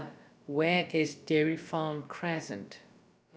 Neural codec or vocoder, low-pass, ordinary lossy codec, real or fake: codec, 16 kHz, about 1 kbps, DyCAST, with the encoder's durations; none; none; fake